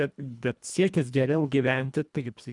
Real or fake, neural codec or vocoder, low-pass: fake; codec, 24 kHz, 1.5 kbps, HILCodec; 10.8 kHz